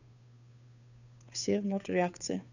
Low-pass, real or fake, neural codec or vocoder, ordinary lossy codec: 7.2 kHz; fake; codec, 16 kHz, 2 kbps, FunCodec, trained on Chinese and English, 25 frames a second; none